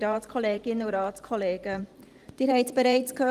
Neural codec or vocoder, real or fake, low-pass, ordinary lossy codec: vocoder, 44.1 kHz, 128 mel bands every 512 samples, BigVGAN v2; fake; 14.4 kHz; Opus, 24 kbps